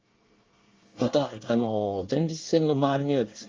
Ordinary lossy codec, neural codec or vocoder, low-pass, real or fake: Opus, 32 kbps; codec, 24 kHz, 1 kbps, SNAC; 7.2 kHz; fake